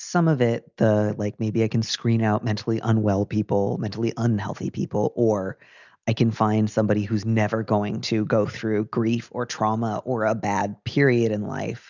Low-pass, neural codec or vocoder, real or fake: 7.2 kHz; none; real